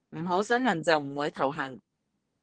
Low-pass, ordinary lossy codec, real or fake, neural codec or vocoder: 9.9 kHz; Opus, 16 kbps; fake; codec, 24 kHz, 1 kbps, SNAC